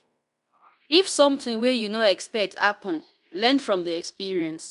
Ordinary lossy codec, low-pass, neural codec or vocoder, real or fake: none; 10.8 kHz; codec, 16 kHz in and 24 kHz out, 0.9 kbps, LongCat-Audio-Codec, fine tuned four codebook decoder; fake